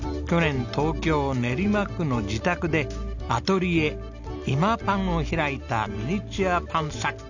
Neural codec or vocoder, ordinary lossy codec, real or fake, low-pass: none; none; real; 7.2 kHz